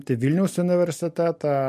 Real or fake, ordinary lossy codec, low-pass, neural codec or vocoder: real; MP3, 64 kbps; 14.4 kHz; none